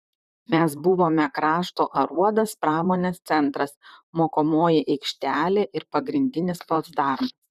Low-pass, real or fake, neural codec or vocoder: 14.4 kHz; fake; vocoder, 44.1 kHz, 128 mel bands, Pupu-Vocoder